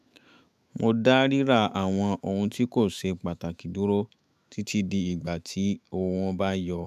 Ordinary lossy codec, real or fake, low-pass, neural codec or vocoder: none; fake; 14.4 kHz; autoencoder, 48 kHz, 128 numbers a frame, DAC-VAE, trained on Japanese speech